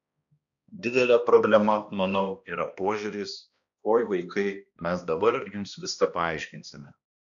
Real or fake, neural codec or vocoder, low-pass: fake; codec, 16 kHz, 1 kbps, X-Codec, HuBERT features, trained on balanced general audio; 7.2 kHz